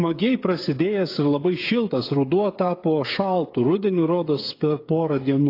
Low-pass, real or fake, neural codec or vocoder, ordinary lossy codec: 5.4 kHz; fake; codec, 16 kHz, 8 kbps, FreqCodec, smaller model; AAC, 32 kbps